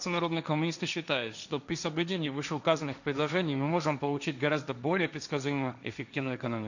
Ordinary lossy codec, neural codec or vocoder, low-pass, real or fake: none; codec, 16 kHz, 1.1 kbps, Voila-Tokenizer; 7.2 kHz; fake